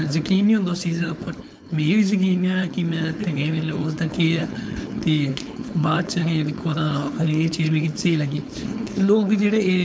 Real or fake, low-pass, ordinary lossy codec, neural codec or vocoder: fake; none; none; codec, 16 kHz, 4.8 kbps, FACodec